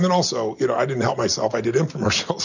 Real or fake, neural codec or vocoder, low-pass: real; none; 7.2 kHz